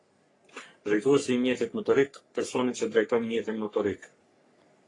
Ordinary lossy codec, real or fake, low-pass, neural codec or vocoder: AAC, 32 kbps; fake; 10.8 kHz; codec, 44.1 kHz, 3.4 kbps, Pupu-Codec